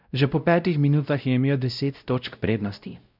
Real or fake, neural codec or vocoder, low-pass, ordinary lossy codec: fake; codec, 16 kHz, 0.5 kbps, X-Codec, WavLM features, trained on Multilingual LibriSpeech; 5.4 kHz; none